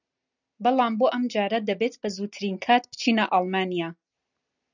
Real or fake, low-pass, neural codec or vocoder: real; 7.2 kHz; none